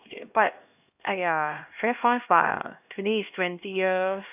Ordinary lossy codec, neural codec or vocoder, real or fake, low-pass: AAC, 32 kbps; codec, 16 kHz, 1 kbps, X-Codec, HuBERT features, trained on LibriSpeech; fake; 3.6 kHz